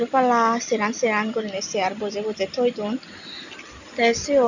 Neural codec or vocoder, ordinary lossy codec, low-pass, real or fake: none; none; 7.2 kHz; real